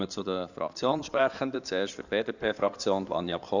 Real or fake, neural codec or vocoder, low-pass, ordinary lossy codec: fake; codec, 16 kHz in and 24 kHz out, 2.2 kbps, FireRedTTS-2 codec; 7.2 kHz; none